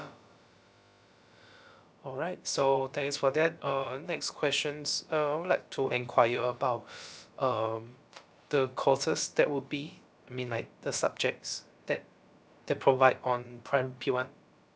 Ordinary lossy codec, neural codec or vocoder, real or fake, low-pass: none; codec, 16 kHz, about 1 kbps, DyCAST, with the encoder's durations; fake; none